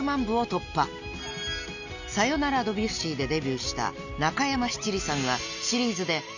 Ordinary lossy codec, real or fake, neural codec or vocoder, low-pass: Opus, 64 kbps; real; none; 7.2 kHz